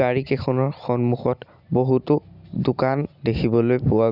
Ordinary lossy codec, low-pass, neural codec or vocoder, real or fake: none; 5.4 kHz; none; real